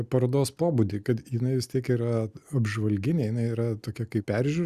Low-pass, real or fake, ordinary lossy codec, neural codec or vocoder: 14.4 kHz; real; Opus, 64 kbps; none